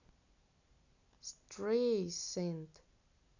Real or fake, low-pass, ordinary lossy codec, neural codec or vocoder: real; 7.2 kHz; none; none